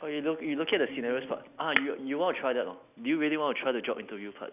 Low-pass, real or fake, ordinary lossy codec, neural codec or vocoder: 3.6 kHz; real; none; none